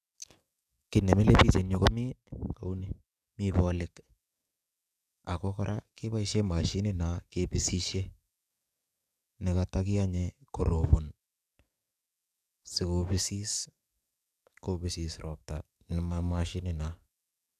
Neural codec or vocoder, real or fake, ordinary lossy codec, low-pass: codec, 44.1 kHz, 7.8 kbps, DAC; fake; none; 14.4 kHz